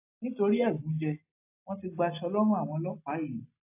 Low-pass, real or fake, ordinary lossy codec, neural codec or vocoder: 3.6 kHz; real; AAC, 24 kbps; none